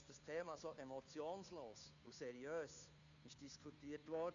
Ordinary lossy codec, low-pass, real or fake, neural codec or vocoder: MP3, 96 kbps; 7.2 kHz; fake; codec, 16 kHz, 2 kbps, FunCodec, trained on Chinese and English, 25 frames a second